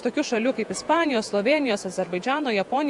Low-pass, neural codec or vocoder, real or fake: 10.8 kHz; vocoder, 48 kHz, 128 mel bands, Vocos; fake